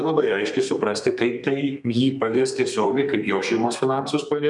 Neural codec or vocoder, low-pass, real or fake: codec, 32 kHz, 1.9 kbps, SNAC; 10.8 kHz; fake